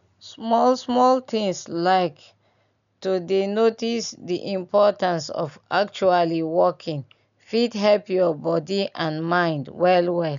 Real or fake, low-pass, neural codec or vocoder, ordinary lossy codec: real; 7.2 kHz; none; none